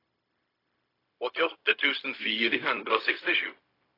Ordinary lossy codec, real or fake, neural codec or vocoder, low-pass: AAC, 24 kbps; fake; codec, 16 kHz, 0.4 kbps, LongCat-Audio-Codec; 5.4 kHz